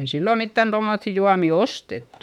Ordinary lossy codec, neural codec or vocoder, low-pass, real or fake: none; autoencoder, 48 kHz, 32 numbers a frame, DAC-VAE, trained on Japanese speech; 19.8 kHz; fake